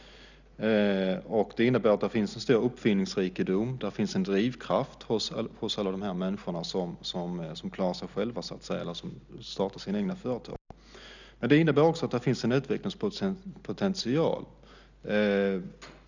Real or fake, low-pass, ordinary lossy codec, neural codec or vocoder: real; 7.2 kHz; none; none